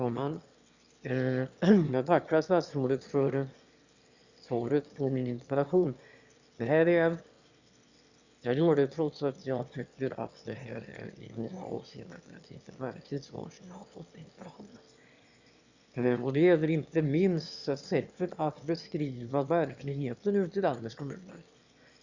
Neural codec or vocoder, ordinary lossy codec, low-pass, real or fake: autoencoder, 22.05 kHz, a latent of 192 numbers a frame, VITS, trained on one speaker; Opus, 64 kbps; 7.2 kHz; fake